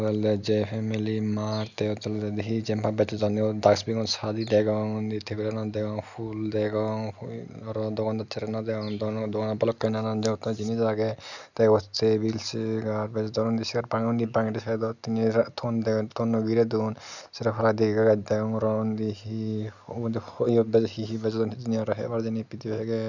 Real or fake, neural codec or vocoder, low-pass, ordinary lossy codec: real; none; 7.2 kHz; none